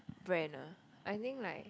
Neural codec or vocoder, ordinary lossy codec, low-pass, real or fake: none; none; none; real